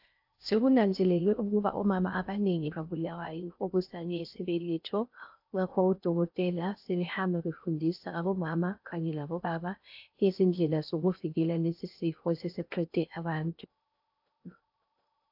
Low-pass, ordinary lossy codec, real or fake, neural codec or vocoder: 5.4 kHz; AAC, 48 kbps; fake; codec, 16 kHz in and 24 kHz out, 0.6 kbps, FocalCodec, streaming, 4096 codes